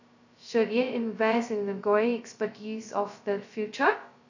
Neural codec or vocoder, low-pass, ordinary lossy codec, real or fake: codec, 16 kHz, 0.2 kbps, FocalCodec; 7.2 kHz; none; fake